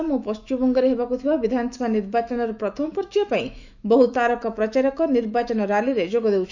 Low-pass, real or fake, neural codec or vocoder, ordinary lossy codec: 7.2 kHz; fake; autoencoder, 48 kHz, 128 numbers a frame, DAC-VAE, trained on Japanese speech; none